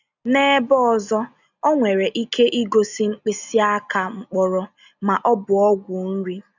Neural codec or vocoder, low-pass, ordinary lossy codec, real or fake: none; 7.2 kHz; none; real